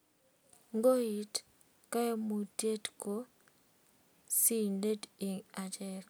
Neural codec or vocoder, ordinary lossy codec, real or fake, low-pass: none; none; real; none